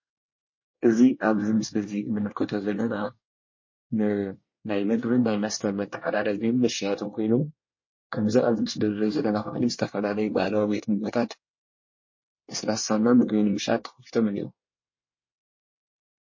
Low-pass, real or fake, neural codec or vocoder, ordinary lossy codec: 7.2 kHz; fake; codec, 24 kHz, 1 kbps, SNAC; MP3, 32 kbps